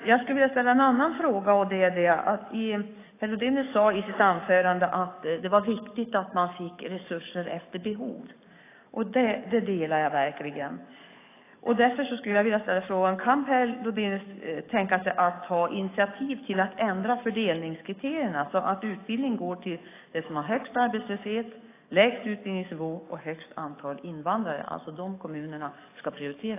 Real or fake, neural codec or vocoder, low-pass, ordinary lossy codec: fake; codec, 44.1 kHz, 7.8 kbps, DAC; 3.6 kHz; AAC, 24 kbps